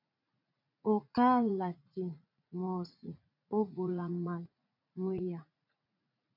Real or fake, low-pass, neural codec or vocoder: fake; 5.4 kHz; vocoder, 44.1 kHz, 80 mel bands, Vocos